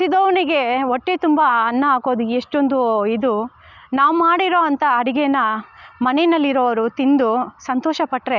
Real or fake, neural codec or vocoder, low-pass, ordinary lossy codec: real; none; 7.2 kHz; none